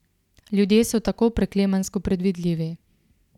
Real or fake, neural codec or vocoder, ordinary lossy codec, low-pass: real; none; none; 19.8 kHz